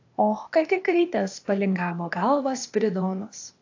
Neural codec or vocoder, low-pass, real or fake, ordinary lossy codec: codec, 16 kHz, 0.8 kbps, ZipCodec; 7.2 kHz; fake; AAC, 48 kbps